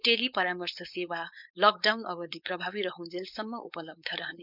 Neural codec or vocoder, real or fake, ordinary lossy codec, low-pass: codec, 16 kHz, 4.8 kbps, FACodec; fake; none; 5.4 kHz